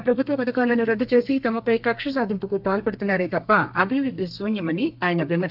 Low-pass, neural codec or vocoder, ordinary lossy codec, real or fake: 5.4 kHz; codec, 32 kHz, 1.9 kbps, SNAC; AAC, 48 kbps; fake